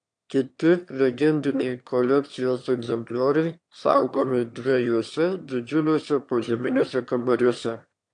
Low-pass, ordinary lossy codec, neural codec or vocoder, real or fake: 9.9 kHz; AAC, 64 kbps; autoencoder, 22.05 kHz, a latent of 192 numbers a frame, VITS, trained on one speaker; fake